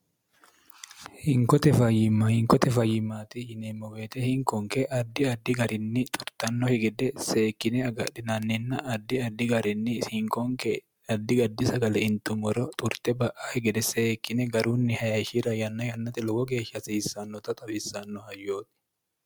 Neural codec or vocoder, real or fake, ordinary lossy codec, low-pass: none; real; MP3, 96 kbps; 19.8 kHz